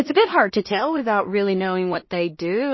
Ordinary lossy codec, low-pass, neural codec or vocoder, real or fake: MP3, 24 kbps; 7.2 kHz; codec, 16 kHz in and 24 kHz out, 0.4 kbps, LongCat-Audio-Codec, two codebook decoder; fake